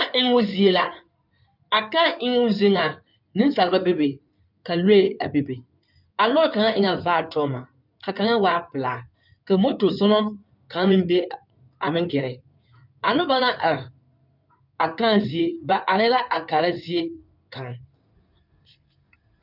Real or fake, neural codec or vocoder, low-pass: fake; codec, 16 kHz in and 24 kHz out, 2.2 kbps, FireRedTTS-2 codec; 5.4 kHz